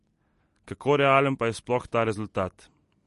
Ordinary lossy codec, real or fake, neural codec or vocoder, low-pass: MP3, 48 kbps; real; none; 14.4 kHz